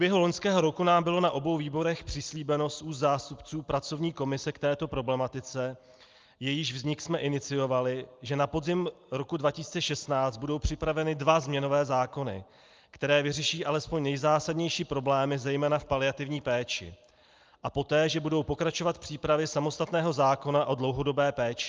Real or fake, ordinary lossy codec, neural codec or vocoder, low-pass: real; Opus, 32 kbps; none; 7.2 kHz